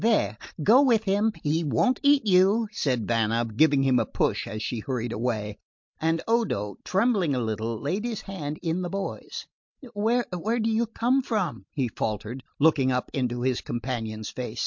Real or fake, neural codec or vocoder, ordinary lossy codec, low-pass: real; none; MP3, 48 kbps; 7.2 kHz